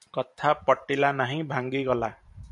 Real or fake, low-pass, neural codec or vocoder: fake; 10.8 kHz; vocoder, 44.1 kHz, 128 mel bands every 512 samples, BigVGAN v2